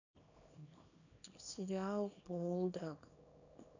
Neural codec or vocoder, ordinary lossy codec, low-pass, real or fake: codec, 24 kHz, 0.9 kbps, WavTokenizer, small release; none; 7.2 kHz; fake